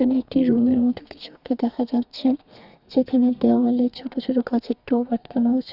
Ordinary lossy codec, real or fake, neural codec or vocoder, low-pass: Opus, 64 kbps; fake; codec, 32 kHz, 1.9 kbps, SNAC; 5.4 kHz